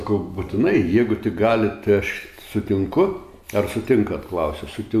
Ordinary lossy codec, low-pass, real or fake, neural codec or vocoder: AAC, 96 kbps; 14.4 kHz; real; none